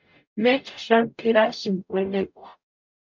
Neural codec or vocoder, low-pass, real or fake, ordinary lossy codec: codec, 44.1 kHz, 0.9 kbps, DAC; 7.2 kHz; fake; MP3, 64 kbps